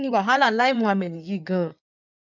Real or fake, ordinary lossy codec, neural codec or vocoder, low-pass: fake; none; codec, 16 kHz in and 24 kHz out, 2.2 kbps, FireRedTTS-2 codec; 7.2 kHz